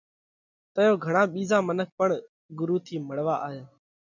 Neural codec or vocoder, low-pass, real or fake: none; 7.2 kHz; real